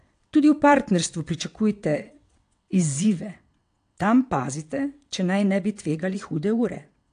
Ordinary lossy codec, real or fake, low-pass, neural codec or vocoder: AAC, 64 kbps; fake; 9.9 kHz; vocoder, 22.05 kHz, 80 mel bands, WaveNeXt